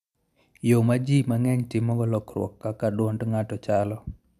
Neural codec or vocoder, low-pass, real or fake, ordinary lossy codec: none; 14.4 kHz; real; none